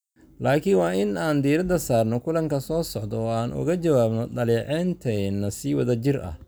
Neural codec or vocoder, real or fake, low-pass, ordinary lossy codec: none; real; none; none